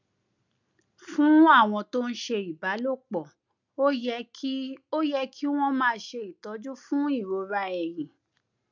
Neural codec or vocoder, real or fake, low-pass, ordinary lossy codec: none; real; 7.2 kHz; none